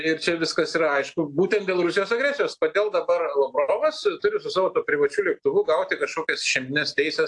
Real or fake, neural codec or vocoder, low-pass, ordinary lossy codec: real; none; 10.8 kHz; AAC, 64 kbps